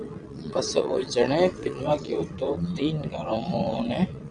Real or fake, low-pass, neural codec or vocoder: fake; 9.9 kHz; vocoder, 22.05 kHz, 80 mel bands, WaveNeXt